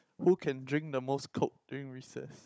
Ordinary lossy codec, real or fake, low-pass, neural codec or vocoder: none; fake; none; codec, 16 kHz, 16 kbps, FunCodec, trained on Chinese and English, 50 frames a second